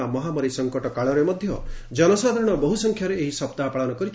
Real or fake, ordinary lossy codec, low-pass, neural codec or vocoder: real; none; none; none